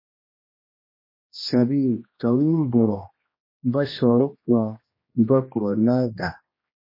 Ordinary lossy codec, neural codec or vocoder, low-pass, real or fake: MP3, 24 kbps; codec, 16 kHz, 1 kbps, X-Codec, HuBERT features, trained on general audio; 5.4 kHz; fake